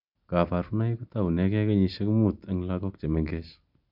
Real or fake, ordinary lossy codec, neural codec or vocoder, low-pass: real; none; none; 5.4 kHz